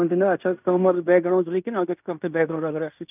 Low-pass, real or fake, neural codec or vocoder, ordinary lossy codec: 3.6 kHz; fake; codec, 16 kHz in and 24 kHz out, 0.9 kbps, LongCat-Audio-Codec, fine tuned four codebook decoder; none